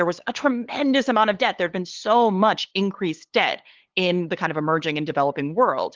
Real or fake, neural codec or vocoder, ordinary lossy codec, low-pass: fake; codec, 16 kHz, 8 kbps, FunCodec, trained on LibriTTS, 25 frames a second; Opus, 16 kbps; 7.2 kHz